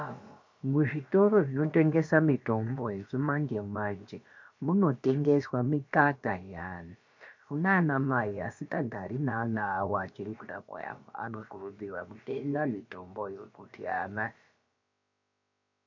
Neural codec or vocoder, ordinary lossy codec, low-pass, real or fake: codec, 16 kHz, about 1 kbps, DyCAST, with the encoder's durations; MP3, 48 kbps; 7.2 kHz; fake